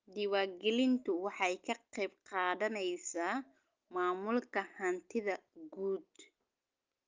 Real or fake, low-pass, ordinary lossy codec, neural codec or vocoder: real; 7.2 kHz; Opus, 32 kbps; none